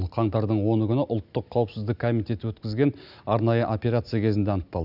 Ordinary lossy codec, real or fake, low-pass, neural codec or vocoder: none; real; 5.4 kHz; none